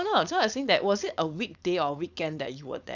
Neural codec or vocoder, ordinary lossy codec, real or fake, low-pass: codec, 16 kHz, 4.8 kbps, FACodec; none; fake; 7.2 kHz